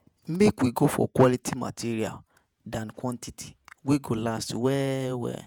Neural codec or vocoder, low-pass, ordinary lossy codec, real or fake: vocoder, 48 kHz, 128 mel bands, Vocos; none; none; fake